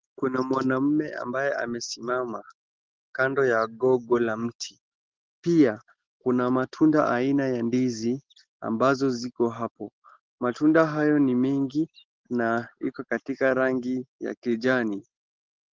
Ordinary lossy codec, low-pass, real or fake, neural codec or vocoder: Opus, 16 kbps; 7.2 kHz; real; none